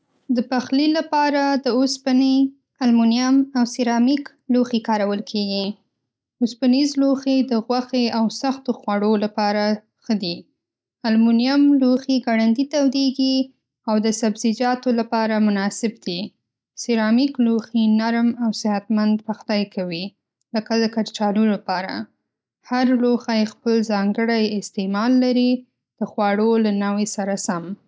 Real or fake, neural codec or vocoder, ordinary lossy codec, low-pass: real; none; none; none